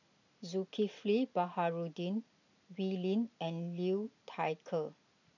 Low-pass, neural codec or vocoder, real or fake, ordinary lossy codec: 7.2 kHz; none; real; none